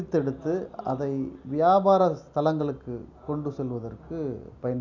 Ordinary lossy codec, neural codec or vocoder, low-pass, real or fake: none; none; 7.2 kHz; real